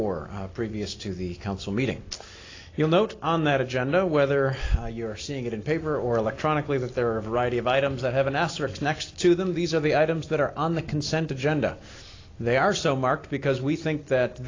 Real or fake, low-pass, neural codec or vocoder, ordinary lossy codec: real; 7.2 kHz; none; AAC, 32 kbps